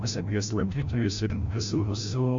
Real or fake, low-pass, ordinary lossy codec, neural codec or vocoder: fake; 7.2 kHz; AAC, 48 kbps; codec, 16 kHz, 0.5 kbps, FreqCodec, larger model